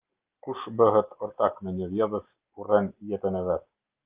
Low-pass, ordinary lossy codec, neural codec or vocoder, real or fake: 3.6 kHz; Opus, 24 kbps; none; real